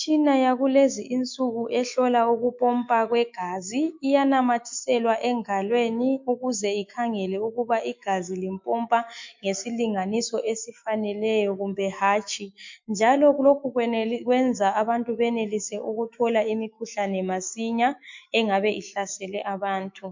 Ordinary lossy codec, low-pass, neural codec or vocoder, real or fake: MP3, 48 kbps; 7.2 kHz; autoencoder, 48 kHz, 128 numbers a frame, DAC-VAE, trained on Japanese speech; fake